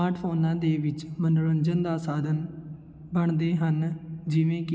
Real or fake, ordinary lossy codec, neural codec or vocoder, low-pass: real; none; none; none